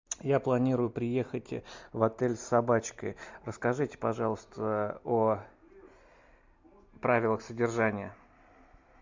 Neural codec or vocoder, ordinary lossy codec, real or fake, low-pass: none; MP3, 64 kbps; real; 7.2 kHz